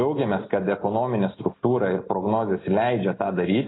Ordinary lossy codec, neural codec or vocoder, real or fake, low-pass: AAC, 16 kbps; none; real; 7.2 kHz